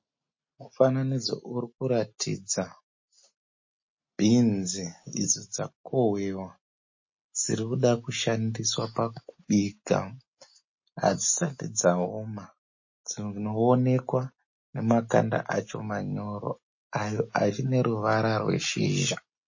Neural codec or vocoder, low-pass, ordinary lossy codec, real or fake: none; 7.2 kHz; MP3, 32 kbps; real